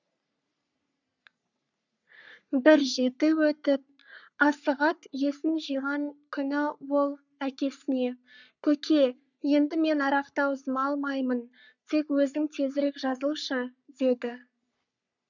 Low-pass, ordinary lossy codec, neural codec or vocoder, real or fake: 7.2 kHz; none; codec, 44.1 kHz, 3.4 kbps, Pupu-Codec; fake